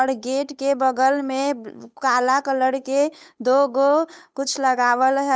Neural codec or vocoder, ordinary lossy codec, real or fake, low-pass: codec, 16 kHz, 8 kbps, FunCodec, trained on Chinese and English, 25 frames a second; none; fake; none